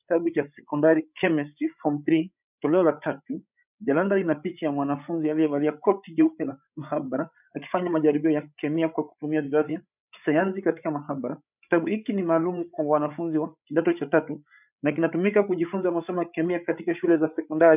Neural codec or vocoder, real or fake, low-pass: codec, 16 kHz, 8 kbps, FreqCodec, larger model; fake; 3.6 kHz